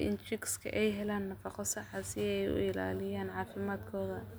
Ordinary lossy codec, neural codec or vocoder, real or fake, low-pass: none; none; real; none